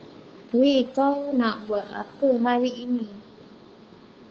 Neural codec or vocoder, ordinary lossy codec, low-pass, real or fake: codec, 16 kHz, 2 kbps, FunCodec, trained on Chinese and English, 25 frames a second; Opus, 24 kbps; 7.2 kHz; fake